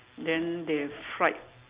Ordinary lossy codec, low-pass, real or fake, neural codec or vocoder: Opus, 64 kbps; 3.6 kHz; real; none